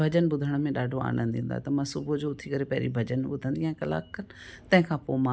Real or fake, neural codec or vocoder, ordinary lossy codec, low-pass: real; none; none; none